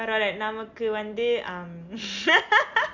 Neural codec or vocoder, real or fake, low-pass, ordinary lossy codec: none; real; 7.2 kHz; none